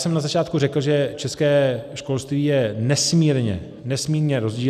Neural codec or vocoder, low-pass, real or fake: none; 14.4 kHz; real